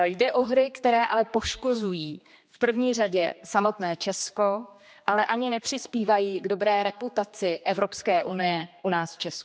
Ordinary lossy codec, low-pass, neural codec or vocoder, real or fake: none; none; codec, 16 kHz, 2 kbps, X-Codec, HuBERT features, trained on general audio; fake